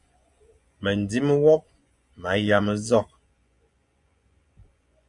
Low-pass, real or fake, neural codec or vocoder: 10.8 kHz; fake; vocoder, 24 kHz, 100 mel bands, Vocos